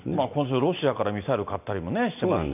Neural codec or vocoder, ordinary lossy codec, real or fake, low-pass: none; none; real; 3.6 kHz